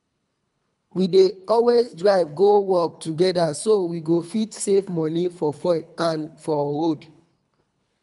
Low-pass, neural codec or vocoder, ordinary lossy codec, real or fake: 10.8 kHz; codec, 24 kHz, 3 kbps, HILCodec; none; fake